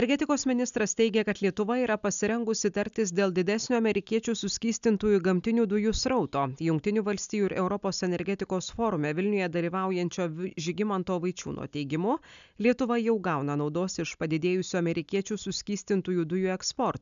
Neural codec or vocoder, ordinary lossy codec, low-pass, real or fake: none; MP3, 96 kbps; 7.2 kHz; real